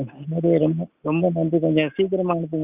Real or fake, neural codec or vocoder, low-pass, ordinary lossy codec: real; none; 3.6 kHz; Opus, 64 kbps